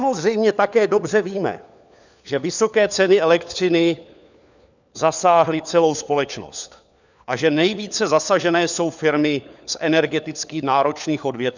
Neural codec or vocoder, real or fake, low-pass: codec, 16 kHz, 4 kbps, FunCodec, trained on LibriTTS, 50 frames a second; fake; 7.2 kHz